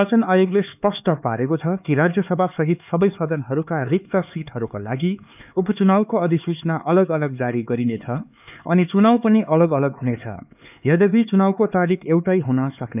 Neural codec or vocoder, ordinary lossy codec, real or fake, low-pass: codec, 16 kHz, 4 kbps, X-Codec, HuBERT features, trained on LibriSpeech; none; fake; 3.6 kHz